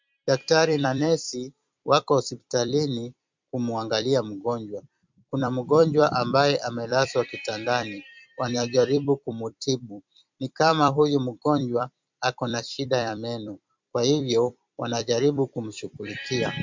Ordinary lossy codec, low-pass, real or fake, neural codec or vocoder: MP3, 64 kbps; 7.2 kHz; fake; vocoder, 44.1 kHz, 128 mel bands every 256 samples, BigVGAN v2